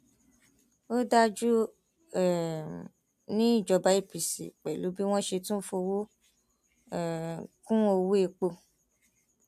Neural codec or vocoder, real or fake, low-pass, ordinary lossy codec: none; real; 14.4 kHz; AAC, 96 kbps